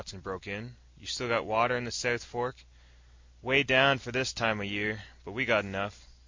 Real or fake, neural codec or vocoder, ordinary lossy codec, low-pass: real; none; MP3, 48 kbps; 7.2 kHz